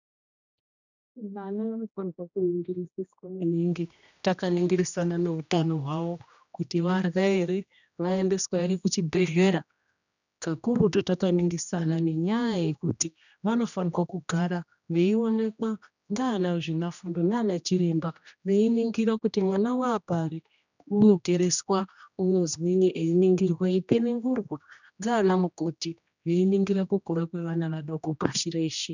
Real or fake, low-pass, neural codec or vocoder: fake; 7.2 kHz; codec, 16 kHz, 1 kbps, X-Codec, HuBERT features, trained on general audio